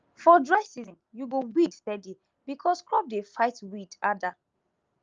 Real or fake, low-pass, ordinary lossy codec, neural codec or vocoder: real; 7.2 kHz; Opus, 24 kbps; none